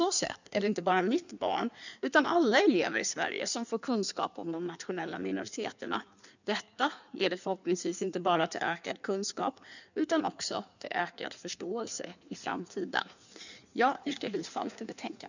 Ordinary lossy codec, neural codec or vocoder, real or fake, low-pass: none; codec, 16 kHz in and 24 kHz out, 1.1 kbps, FireRedTTS-2 codec; fake; 7.2 kHz